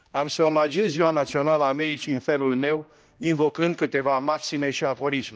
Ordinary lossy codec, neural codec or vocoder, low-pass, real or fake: none; codec, 16 kHz, 1 kbps, X-Codec, HuBERT features, trained on general audio; none; fake